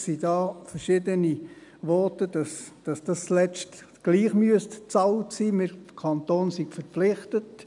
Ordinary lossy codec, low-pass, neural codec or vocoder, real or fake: none; 10.8 kHz; none; real